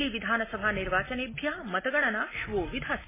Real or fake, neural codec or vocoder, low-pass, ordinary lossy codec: real; none; 3.6 kHz; MP3, 16 kbps